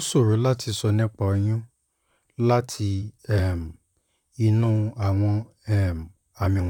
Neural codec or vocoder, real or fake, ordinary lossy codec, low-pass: vocoder, 44.1 kHz, 128 mel bands, Pupu-Vocoder; fake; none; 19.8 kHz